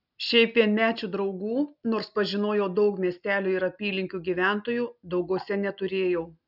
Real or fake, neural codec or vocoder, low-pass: real; none; 5.4 kHz